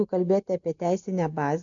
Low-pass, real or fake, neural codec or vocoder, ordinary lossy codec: 7.2 kHz; real; none; MP3, 64 kbps